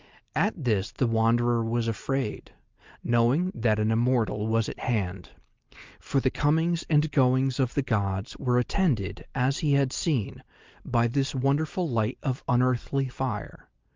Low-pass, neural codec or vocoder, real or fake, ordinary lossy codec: 7.2 kHz; none; real; Opus, 32 kbps